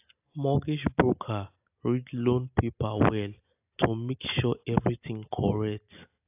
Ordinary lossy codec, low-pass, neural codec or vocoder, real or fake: AAC, 32 kbps; 3.6 kHz; none; real